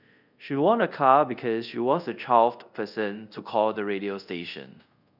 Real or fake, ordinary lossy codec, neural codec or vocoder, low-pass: fake; none; codec, 24 kHz, 0.5 kbps, DualCodec; 5.4 kHz